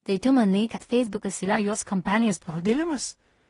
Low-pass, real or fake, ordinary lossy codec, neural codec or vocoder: 10.8 kHz; fake; AAC, 32 kbps; codec, 16 kHz in and 24 kHz out, 0.4 kbps, LongCat-Audio-Codec, two codebook decoder